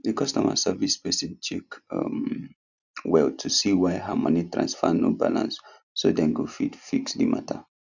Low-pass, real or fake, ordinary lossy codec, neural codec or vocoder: 7.2 kHz; real; none; none